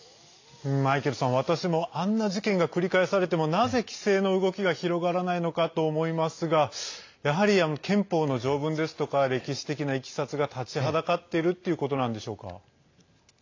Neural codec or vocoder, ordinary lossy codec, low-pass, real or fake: none; AAC, 48 kbps; 7.2 kHz; real